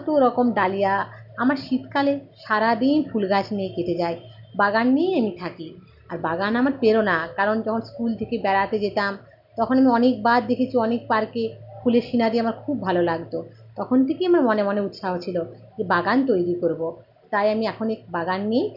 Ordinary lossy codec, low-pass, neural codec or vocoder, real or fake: none; 5.4 kHz; none; real